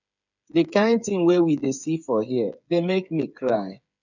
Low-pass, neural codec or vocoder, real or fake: 7.2 kHz; codec, 16 kHz, 8 kbps, FreqCodec, smaller model; fake